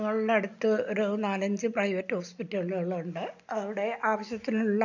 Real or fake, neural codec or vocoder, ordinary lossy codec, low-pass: real; none; none; 7.2 kHz